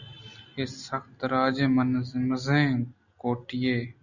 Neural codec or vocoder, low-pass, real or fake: none; 7.2 kHz; real